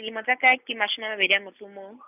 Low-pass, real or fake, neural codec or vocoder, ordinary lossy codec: 3.6 kHz; real; none; none